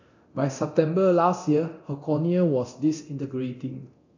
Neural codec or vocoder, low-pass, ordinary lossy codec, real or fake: codec, 24 kHz, 0.9 kbps, DualCodec; 7.2 kHz; AAC, 48 kbps; fake